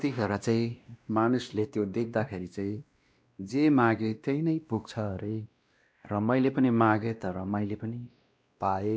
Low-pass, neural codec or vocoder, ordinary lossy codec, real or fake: none; codec, 16 kHz, 1 kbps, X-Codec, WavLM features, trained on Multilingual LibriSpeech; none; fake